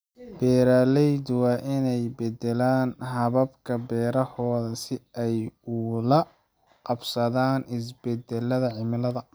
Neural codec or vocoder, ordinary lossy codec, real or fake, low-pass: none; none; real; none